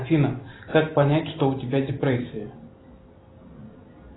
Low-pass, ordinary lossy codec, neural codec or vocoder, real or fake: 7.2 kHz; AAC, 16 kbps; none; real